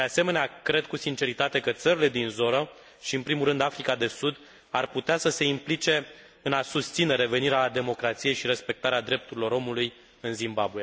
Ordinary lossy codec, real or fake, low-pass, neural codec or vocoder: none; real; none; none